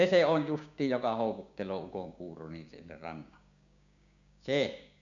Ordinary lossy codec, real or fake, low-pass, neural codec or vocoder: none; fake; 7.2 kHz; codec, 16 kHz, 6 kbps, DAC